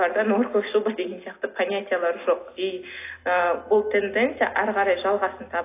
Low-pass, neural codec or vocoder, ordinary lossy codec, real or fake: 3.6 kHz; none; AAC, 24 kbps; real